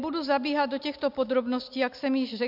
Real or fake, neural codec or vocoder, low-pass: real; none; 5.4 kHz